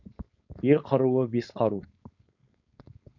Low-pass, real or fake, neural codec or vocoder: 7.2 kHz; fake; codec, 16 kHz, 4.8 kbps, FACodec